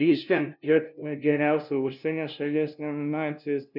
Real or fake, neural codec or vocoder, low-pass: fake; codec, 16 kHz, 0.5 kbps, FunCodec, trained on LibriTTS, 25 frames a second; 5.4 kHz